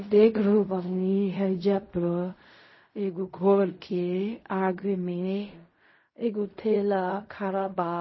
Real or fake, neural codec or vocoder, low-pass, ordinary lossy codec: fake; codec, 16 kHz in and 24 kHz out, 0.4 kbps, LongCat-Audio-Codec, fine tuned four codebook decoder; 7.2 kHz; MP3, 24 kbps